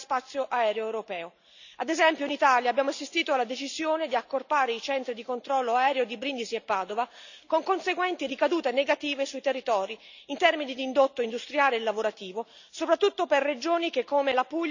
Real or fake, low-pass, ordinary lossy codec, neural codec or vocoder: real; 7.2 kHz; none; none